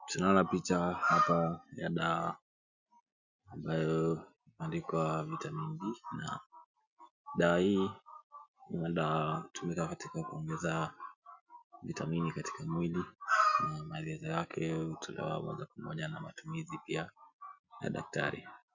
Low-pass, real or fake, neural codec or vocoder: 7.2 kHz; real; none